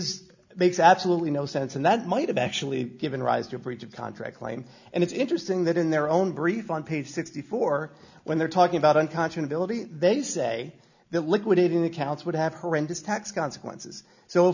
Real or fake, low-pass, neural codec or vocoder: real; 7.2 kHz; none